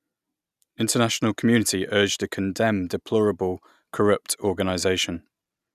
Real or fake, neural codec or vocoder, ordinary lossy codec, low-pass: real; none; none; 14.4 kHz